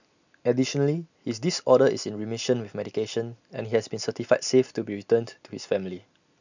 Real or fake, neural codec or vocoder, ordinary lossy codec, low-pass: real; none; none; 7.2 kHz